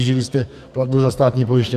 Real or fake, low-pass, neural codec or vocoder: fake; 14.4 kHz; codec, 44.1 kHz, 2.6 kbps, SNAC